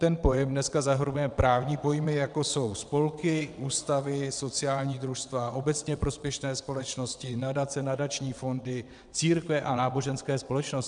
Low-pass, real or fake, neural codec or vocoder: 9.9 kHz; fake; vocoder, 22.05 kHz, 80 mel bands, WaveNeXt